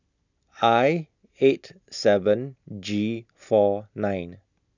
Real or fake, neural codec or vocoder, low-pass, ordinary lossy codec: real; none; 7.2 kHz; none